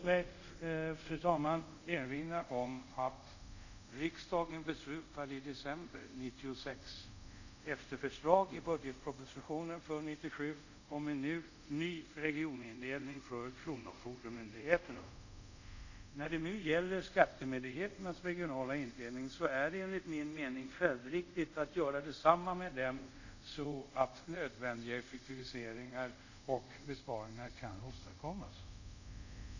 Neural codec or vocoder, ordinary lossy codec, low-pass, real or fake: codec, 24 kHz, 0.5 kbps, DualCodec; none; 7.2 kHz; fake